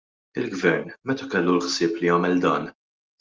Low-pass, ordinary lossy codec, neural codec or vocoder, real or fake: 7.2 kHz; Opus, 32 kbps; none; real